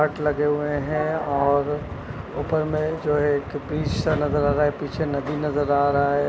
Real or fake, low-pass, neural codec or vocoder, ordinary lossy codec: real; none; none; none